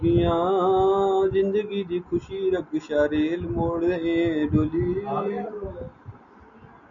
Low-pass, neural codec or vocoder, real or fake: 7.2 kHz; none; real